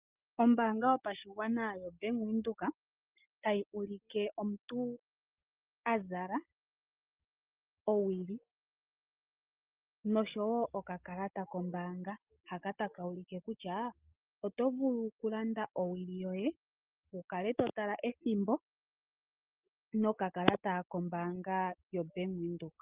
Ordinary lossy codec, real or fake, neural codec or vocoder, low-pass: Opus, 24 kbps; real; none; 3.6 kHz